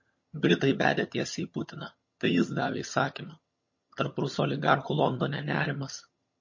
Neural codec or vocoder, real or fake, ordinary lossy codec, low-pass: vocoder, 22.05 kHz, 80 mel bands, HiFi-GAN; fake; MP3, 32 kbps; 7.2 kHz